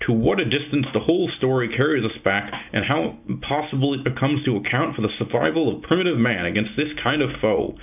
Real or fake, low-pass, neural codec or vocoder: real; 3.6 kHz; none